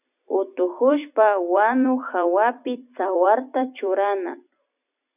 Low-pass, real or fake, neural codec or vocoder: 3.6 kHz; fake; vocoder, 44.1 kHz, 128 mel bands every 256 samples, BigVGAN v2